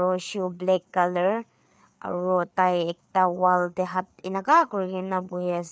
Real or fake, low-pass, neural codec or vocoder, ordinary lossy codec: fake; none; codec, 16 kHz, 4 kbps, FreqCodec, larger model; none